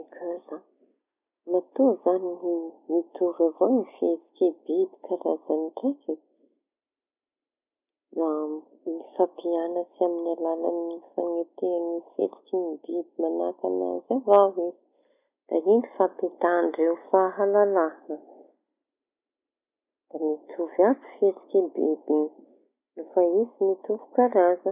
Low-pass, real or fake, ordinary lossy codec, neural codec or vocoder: 3.6 kHz; real; none; none